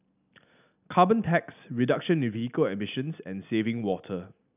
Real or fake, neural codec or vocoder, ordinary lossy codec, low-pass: real; none; none; 3.6 kHz